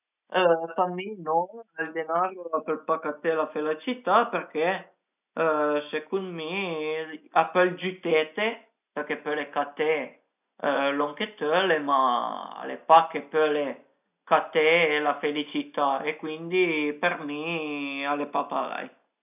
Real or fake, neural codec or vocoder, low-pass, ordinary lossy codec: real; none; 3.6 kHz; none